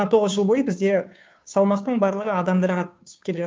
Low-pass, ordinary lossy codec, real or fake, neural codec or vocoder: none; none; fake; codec, 16 kHz, 2 kbps, FunCodec, trained on Chinese and English, 25 frames a second